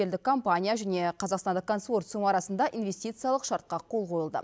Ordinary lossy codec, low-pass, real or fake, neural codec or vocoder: none; none; real; none